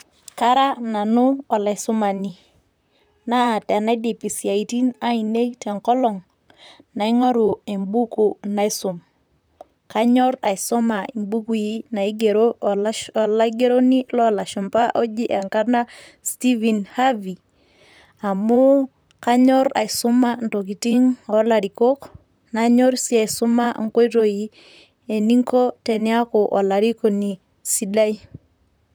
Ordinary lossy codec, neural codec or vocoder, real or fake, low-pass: none; vocoder, 44.1 kHz, 128 mel bands, Pupu-Vocoder; fake; none